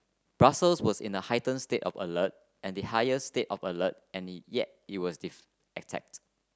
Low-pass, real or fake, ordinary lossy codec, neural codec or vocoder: none; real; none; none